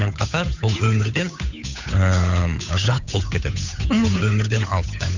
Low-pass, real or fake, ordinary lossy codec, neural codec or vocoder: 7.2 kHz; fake; Opus, 64 kbps; codec, 16 kHz, 8 kbps, FunCodec, trained on Chinese and English, 25 frames a second